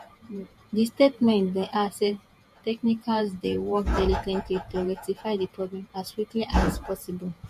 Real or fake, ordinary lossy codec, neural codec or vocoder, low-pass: fake; MP3, 64 kbps; vocoder, 48 kHz, 128 mel bands, Vocos; 14.4 kHz